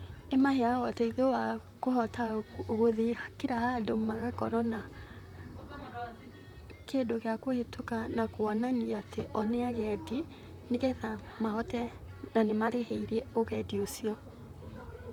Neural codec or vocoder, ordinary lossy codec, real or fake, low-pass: vocoder, 44.1 kHz, 128 mel bands, Pupu-Vocoder; none; fake; 19.8 kHz